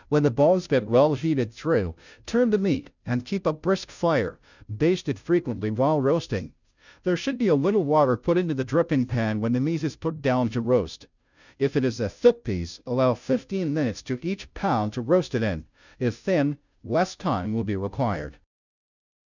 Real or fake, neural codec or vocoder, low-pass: fake; codec, 16 kHz, 0.5 kbps, FunCodec, trained on Chinese and English, 25 frames a second; 7.2 kHz